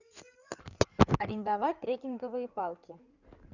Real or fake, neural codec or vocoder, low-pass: fake; codec, 16 kHz in and 24 kHz out, 2.2 kbps, FireRedTTS-2 codec; 7.2 kHz